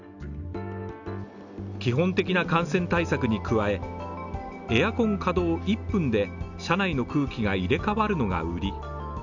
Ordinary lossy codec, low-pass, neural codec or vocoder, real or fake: none; 7.2 kHz; none; real